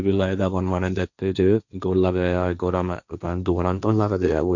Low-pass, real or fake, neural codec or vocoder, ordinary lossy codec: 7.2 kHz; fake; codec, 16 kHz, 1.1 kbps, Voila-Tokenizer; none